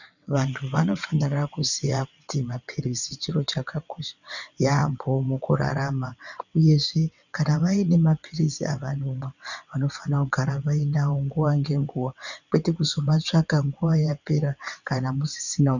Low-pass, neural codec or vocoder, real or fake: 7.2 kHz; vocoder, 22.05 kHz, 80 mel bands, WaveNeXt; fake